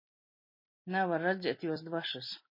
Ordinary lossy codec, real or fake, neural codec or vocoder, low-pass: MP3, 24 kbps; real; none; 5.4 kHz